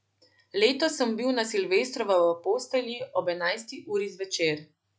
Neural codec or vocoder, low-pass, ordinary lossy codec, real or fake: none; none; none; real